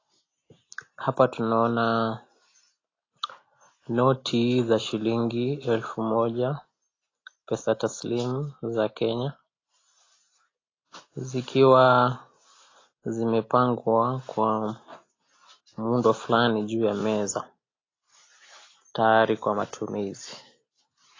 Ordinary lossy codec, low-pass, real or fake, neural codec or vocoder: AAC, 32 kbps; 7.2 kHz; real; none